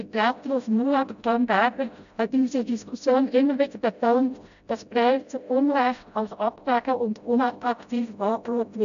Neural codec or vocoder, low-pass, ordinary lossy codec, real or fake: codec, 16 kHz, 0.5 kbps, FreqCodec, smaller model; 7.2 kHz; none; fake